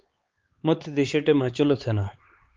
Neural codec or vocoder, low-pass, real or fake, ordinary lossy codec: codec, 16 kHz, 4 kbps, X-Codec, HuBERT features, trained on LibriSpeech; 7.2 kHz; fake; Opus, 24 kbps